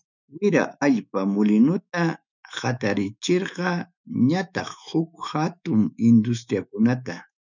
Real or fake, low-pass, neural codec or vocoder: fake; 7.2 kHz; autoencoder, 48 kHz, 128 numbers a frame, DAC-VAE, trained on Japanese speech